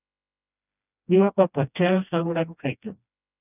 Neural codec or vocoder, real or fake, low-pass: codec, 16 kHz, 1 kbps, FreqCodec, smaller model; fake; 3.6 kHz